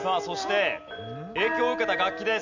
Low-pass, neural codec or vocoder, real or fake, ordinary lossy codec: 7.2 kHz; none; real; none